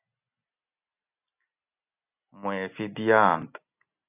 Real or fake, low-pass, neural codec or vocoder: real; 3.6 kHz; none